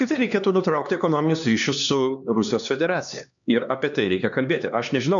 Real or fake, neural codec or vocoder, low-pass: fake; codec, 16 kHz, 2 kbps, X-Codec, HuBERT features, trained on LibriSpeech; 7.2 kHz